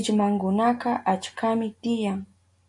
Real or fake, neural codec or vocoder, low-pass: real; none; 10.8 kHz